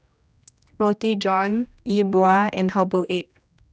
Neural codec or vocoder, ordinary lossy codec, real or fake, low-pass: codec, 16 kHz, 1 kbps, X-Codec, HuBERT features, trained on general audio; none; fake; none